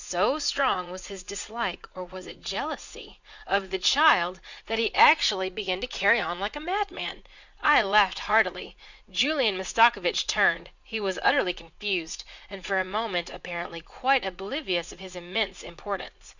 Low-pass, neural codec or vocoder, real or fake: 7.2 kHz; vocoder, 44.1 kHz, 80 mel bands, Vocos; fake